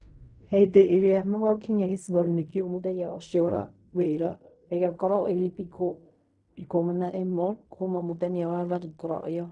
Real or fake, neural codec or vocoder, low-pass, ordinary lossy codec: fake; codec, 16 kHz in and 24 kHz out, 0.4 kbps, LongCat-Audio-Codec, fine tuned four codebook decoder; 10.8 kHz; none